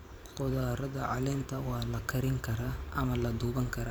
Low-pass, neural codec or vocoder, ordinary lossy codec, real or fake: none; vocoder, 44.1 kHz, 128 mel bands every 512 samples, BigVGAN v2; none; fake